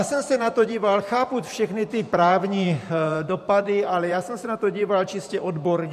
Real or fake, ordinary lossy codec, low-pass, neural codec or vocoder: fake; MP3, 64 kbps; 14.4 kHz; vocoder, 44.1 kHz, 128 mel bands every 512 samples, BigVGAN v2